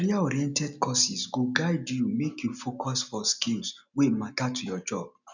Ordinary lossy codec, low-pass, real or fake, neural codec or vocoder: none; 7.2 kHz; real; none